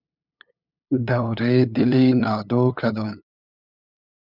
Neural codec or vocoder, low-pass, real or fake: codec, 16 kHz, 8 kbps, FunCodec, trained on LibriTTS, 25 frames a second; 5.4 kHz; fake